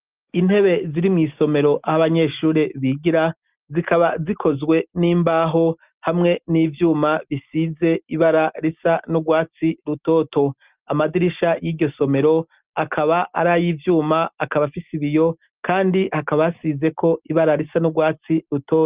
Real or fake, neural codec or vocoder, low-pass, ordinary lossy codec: real; none; 3.6 kHz; Opus, 24 kbps